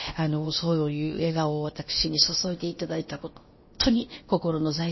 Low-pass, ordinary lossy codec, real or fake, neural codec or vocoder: 7.2 kHz; MP3, 24 kbps; fake; codec, 16 kHz, about 1 kbps, DyCAST, with the encoder's durations